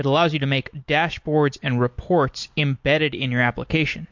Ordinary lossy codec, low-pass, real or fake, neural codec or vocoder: MP3, 48 kbps; 7.2 kHz; real; none